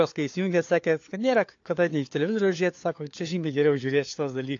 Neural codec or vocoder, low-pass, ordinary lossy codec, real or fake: codec, 16 kHz, 4 kbps, FunCodec, trained on LibriTTS, 50 frames a second; 7.2 kHz; AAC, 48 kbps; fake